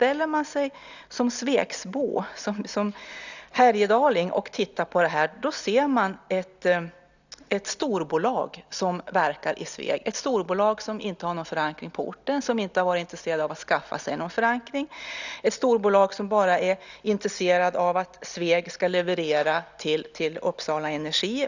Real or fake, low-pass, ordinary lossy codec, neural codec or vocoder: real; 7.2 kHz; none; none